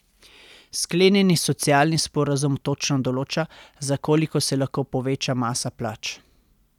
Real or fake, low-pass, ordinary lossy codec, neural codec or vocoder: real; 19.8 kHz; none; none